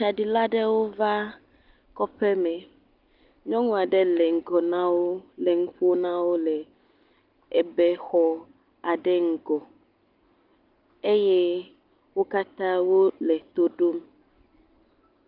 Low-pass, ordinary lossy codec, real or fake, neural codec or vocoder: 5.4 kHz; Opus, 32 kbps; real; none